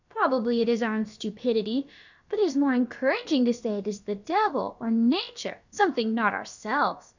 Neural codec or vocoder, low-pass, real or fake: codec, 16 kHz, about 1 kbps, DyCAST, with the encoder's durations; 7.2 kHz; fake